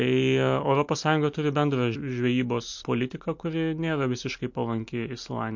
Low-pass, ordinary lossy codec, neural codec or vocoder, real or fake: 7.2 kHz; MP3, 48 kbps; none; real